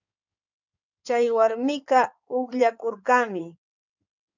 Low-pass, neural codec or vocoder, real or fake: 7.2 kHz; codec, 16 kHz in and 24 kHz out, 2.2 kbps, FireRedTTS-2 codec; fake